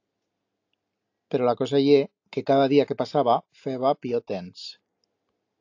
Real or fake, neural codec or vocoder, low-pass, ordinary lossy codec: real; none; 7.2 kHz; AAC, 48 kbps